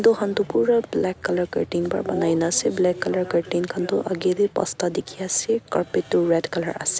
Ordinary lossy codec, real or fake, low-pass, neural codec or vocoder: none; real; none; none